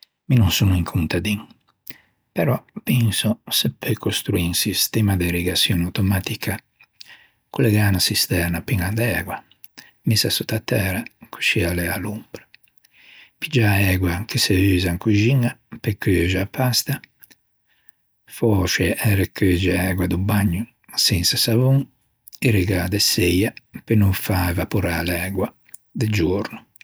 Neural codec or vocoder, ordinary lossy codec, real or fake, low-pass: none; none; real; none